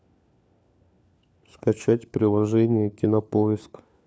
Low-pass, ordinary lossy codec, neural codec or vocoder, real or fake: none; none; codec, 16 kHz, 4 kbps, FunCodec, trained on LibriTTS, 50 frames a second; fake